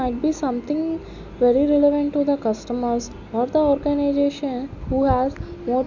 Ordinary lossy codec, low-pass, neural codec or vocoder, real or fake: none; 7.2 kHz; none; real